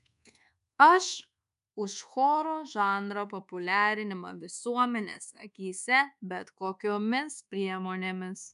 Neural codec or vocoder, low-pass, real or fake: codec, 24 kHz, 1.2 kbps, DualCodec; 10.8 kHz; fake